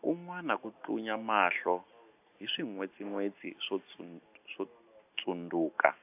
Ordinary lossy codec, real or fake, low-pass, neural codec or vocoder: AAC, 32 kbps; real; 3.6 kHz; none